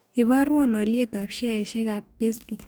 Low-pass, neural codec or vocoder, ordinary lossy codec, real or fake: none; codec, 44.1 kHz, 2.6 kbps, DAC; none; fake